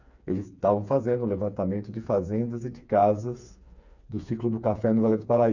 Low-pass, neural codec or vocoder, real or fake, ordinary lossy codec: 7.2 kHz; codec, 16 kHz, 4 kbps, FreqCodec, smaller model; fake; none